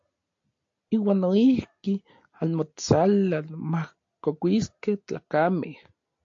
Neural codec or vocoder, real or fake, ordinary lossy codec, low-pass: none; real; AAC, 48 kbps; 7.2 kHz